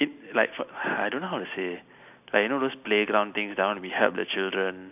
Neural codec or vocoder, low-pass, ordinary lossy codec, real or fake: none; 3.6 kHz; none; real